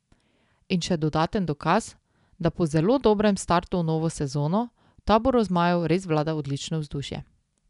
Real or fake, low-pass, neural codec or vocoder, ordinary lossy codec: real; 10.8 kHz; none; none